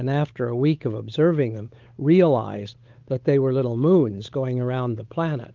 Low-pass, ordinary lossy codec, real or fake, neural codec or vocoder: 7.2 kHz; Opus, 32 kbps; fake; codec, 16 kHz, 8 kbps, FunCodec, trained on Chinese and English, 25 frames a second